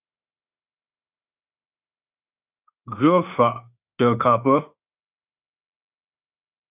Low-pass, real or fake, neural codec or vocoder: 3.6 kHz; fake; autoencoder, 48 kHz, 32 numbers a frame, DAC-VAE, trained on Japanese speech